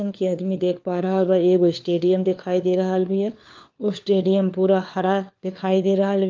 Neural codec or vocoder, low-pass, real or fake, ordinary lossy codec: codec, 16 kHz, 4 kbps, FunCodec, trained on LibriTTS, 50 frames a second; 7.2 kHz; fake; Opus, 32 kbps